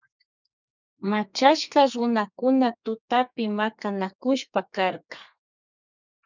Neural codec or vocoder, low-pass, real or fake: codec, 32 kHz, 1.9 kbps, SNAC; 7.2 kHz; fake